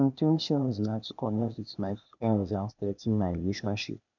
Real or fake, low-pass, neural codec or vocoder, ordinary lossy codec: fake; 7.2 kHz; codec, 16 kHz, 0.8 kbps, ZipCodec; none